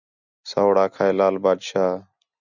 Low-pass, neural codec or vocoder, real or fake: 7.2 kHz; none; real